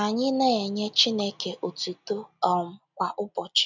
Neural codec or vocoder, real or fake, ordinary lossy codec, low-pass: none; real; none; 7.2 kHz